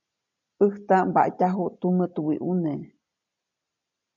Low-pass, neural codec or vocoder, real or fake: 7.2 kHz; none; real